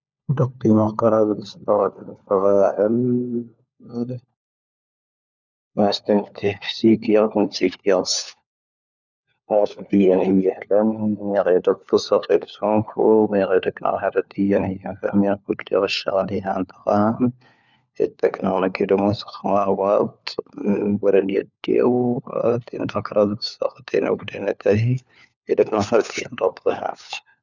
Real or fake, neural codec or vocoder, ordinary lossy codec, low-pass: fake; codec, 16 kHz, 4 kbps, FunCodec, trained on LibriTTS, 50 frames a second; none; 7.2 kHz